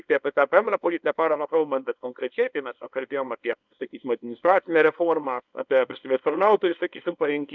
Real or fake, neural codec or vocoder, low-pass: fake; codec, 24 kHz, 0.9 kbps, WavTokenizer, small release; 7.2 kHz